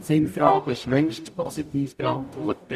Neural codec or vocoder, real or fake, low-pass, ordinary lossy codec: codec, 44.1 kHz, 0.9 kbps, DAC; fake; 14.4 kHz; none